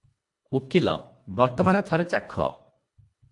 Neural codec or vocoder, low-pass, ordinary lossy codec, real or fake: codec, 24 kHz, 1.5 kbps, HILCodec; 10.8 kHz; AAC, 64 kbps; fake